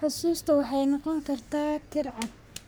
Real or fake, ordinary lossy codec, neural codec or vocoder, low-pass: fake; none; codec, 44.1 kHz, 3.4 kbps, Pupu-Codec; none